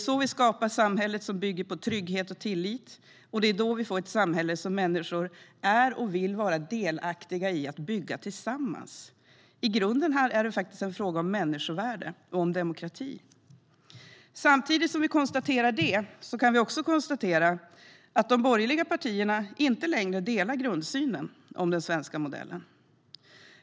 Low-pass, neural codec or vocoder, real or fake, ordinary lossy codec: none; none; real; none